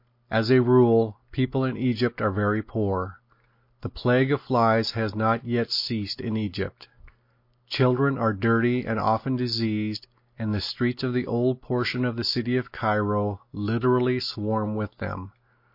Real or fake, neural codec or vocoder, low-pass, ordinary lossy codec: real; none; 5.4 kHz; MP3, 32 kbps